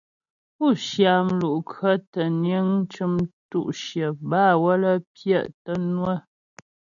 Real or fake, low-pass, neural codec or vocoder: real; 7.2 kHz; none